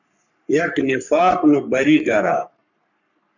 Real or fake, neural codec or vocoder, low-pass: fake; codec, 44.1 kHz, 3.4 kbps, Pupu-Codec; 7.2 kHz